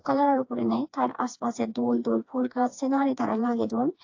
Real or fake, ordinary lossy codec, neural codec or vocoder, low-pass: fake; AAC, 48 kbps; codec, 16 kHz, 2 kbps, FreqCodec, smaller model; 7.2 kHz